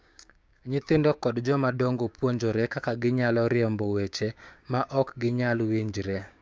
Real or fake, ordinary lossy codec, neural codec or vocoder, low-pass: fake; none; codec, 16 kHz, 6 kbps, DAC; none